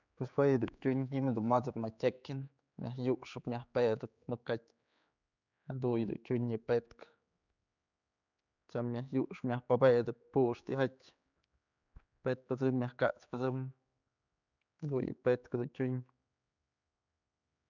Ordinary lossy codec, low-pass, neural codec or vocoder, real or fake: none; 7.2 kHz; codec, 16 kHz, 4 kbps, X-Codec, HuBERT features, trained on general audio; fake